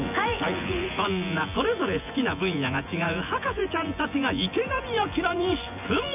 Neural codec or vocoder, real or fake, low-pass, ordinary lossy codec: vocoder, 24 kHz, 100 mel bands, Vocos; fake; 3.6 kHz; none